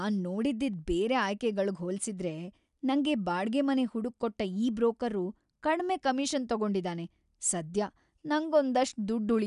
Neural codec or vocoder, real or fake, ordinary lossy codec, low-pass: none; real; none; 10.8 kHz